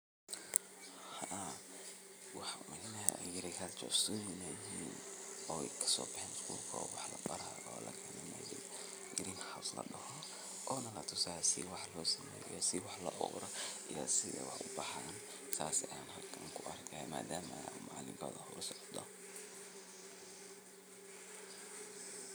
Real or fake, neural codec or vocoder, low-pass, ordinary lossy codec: fake; vocoder, 44.1 kHz, 128 mel bands every 256 samples, BigVGAN v2; none; none